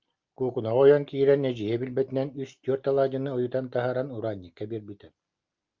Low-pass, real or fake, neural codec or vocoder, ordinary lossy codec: 7.2 kHz; real; none; Opus, 24 kbps